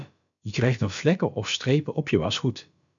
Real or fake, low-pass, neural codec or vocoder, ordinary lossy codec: fake; 7.2 kHz; codec, 16 kHz, about 1 kbps, DyCAST, with the encoder's durations; MP3, 64 kbps